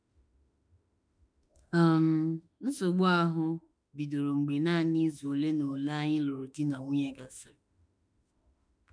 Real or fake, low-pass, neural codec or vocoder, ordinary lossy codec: fake; 9.9 kHz; autoencoder, 48 kHz, 32 numbers a frame, DAC-VAE, trained on Japanese speech; none